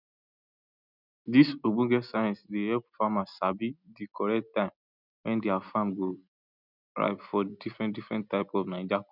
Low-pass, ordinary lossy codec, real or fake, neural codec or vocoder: 5.4 kHz; none; real; none